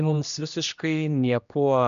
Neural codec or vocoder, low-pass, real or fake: codec, 16 kHz, 1 kbps, X-Codec, HuBERT features, trained on general audio; 7.2 kHz; fake